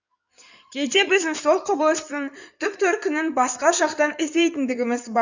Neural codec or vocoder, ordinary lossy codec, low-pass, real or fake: codec, 16 kHz in and 24 kHz out, 2.2 kbps, FireRedTTS-2 codec; none; 7.2 kHz; fake